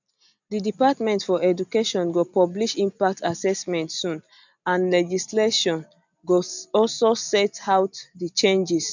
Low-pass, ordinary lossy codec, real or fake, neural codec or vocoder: 7.2 kHz; none; real; none